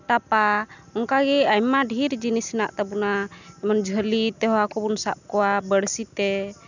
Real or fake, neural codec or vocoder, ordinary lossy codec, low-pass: real; none; none; 7.2 kHz